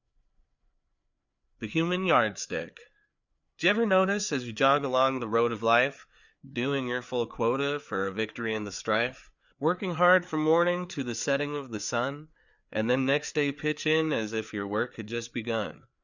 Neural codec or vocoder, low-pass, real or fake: codec, 16 kHz, 4 kbps, FreqCodec, larger model; 7.2 kHz; fake